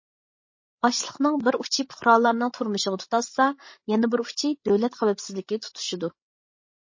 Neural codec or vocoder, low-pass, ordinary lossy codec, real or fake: codec, 16 kHz, 16 kbps, FreqCodec, larger model; 7.2 kHz; MP3, 32 kbps; fake